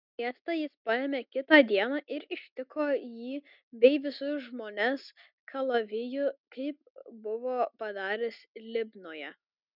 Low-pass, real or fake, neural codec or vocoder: 5.4 kHz; real; none